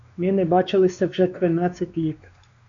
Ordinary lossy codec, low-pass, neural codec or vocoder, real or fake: MP3, 64 kbps; 7.2 kHz; codec, 16 kHz, 2 kbps, X-Codec, WavLM features, trained on Multilingual LibriSpeech; fake